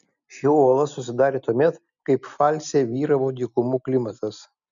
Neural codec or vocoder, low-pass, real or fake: none; 7.2 kHz; real